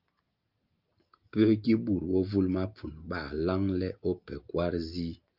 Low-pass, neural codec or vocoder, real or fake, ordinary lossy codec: 5.4 kHz; none; real; Opus, 24 kbps